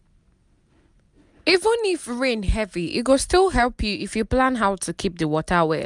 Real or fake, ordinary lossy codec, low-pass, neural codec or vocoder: real; none; 10.8 kHz; none